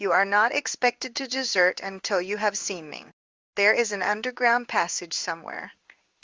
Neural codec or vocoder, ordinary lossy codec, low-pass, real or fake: codec, 16 kHz in and 24 kHz out, 1 kbps, XY-Tokenizer; Opus, 32 kbps; 7.2 kHz; fake